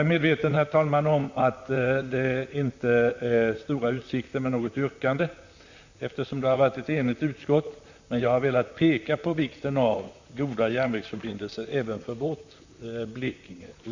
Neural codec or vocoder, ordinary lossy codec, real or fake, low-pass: vocoder, 44.1 kHz, 128 mel bands, Pupu-Vocoder; Opus, 64 kbps; fake; 7.2 kHz